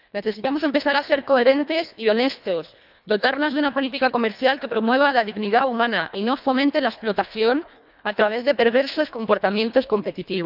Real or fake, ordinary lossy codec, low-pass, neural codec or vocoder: fake; none; 5.4 kHz; codec, 24 kHz, 1.5 kbps, HILCodec